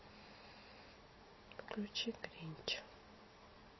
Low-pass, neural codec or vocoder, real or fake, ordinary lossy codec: 7.2 kHz; none; real; MP3, 24 kbps